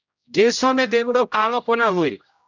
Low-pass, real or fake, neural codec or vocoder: 7.2 kHz; fake; codec, 16 kHz, 0.5 kbps, X-Codec, HuBERT features, trained on general audio